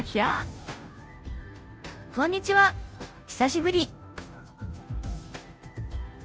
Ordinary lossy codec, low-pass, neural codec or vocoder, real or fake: none; none; codec, 16 kHz, 0.5 kbps, FunCodec, trained on Chinese and English, 25 frames a second; fake